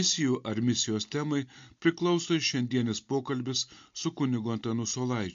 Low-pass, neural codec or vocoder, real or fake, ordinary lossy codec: 7.2 kHz; none; real; MP3, 48 kbps